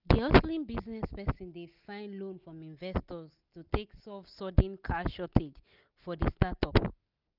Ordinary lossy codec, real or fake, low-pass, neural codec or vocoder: none; real; 5.4 kHz; none